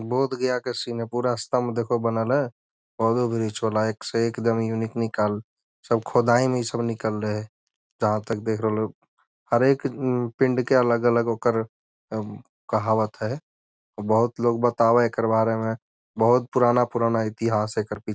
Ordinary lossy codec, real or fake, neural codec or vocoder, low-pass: none; real; none; none